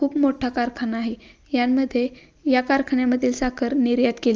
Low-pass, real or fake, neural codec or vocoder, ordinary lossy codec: 7.2 kHz; real; none; Opus, 24 kbps